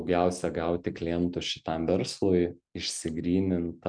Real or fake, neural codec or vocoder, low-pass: real; none; 9.9 kHz